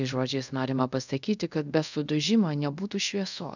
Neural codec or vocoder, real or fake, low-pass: codec, 24 kHz, 0.5 kbps, DualCodec; fake; 7.2 kHz